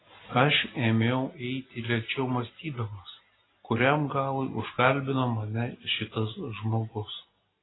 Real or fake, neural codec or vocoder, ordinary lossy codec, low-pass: real; none; AAC, 16 kbps; 7.2 kHz